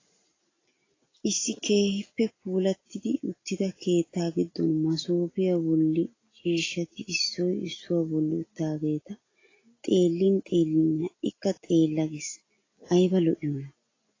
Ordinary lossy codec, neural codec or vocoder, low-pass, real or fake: AAC, 32 kbps; none; 7.2 kHz; real